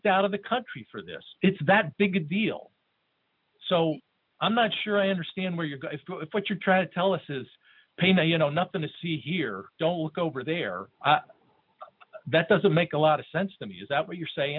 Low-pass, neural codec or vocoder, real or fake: 5.4 kHz; none; real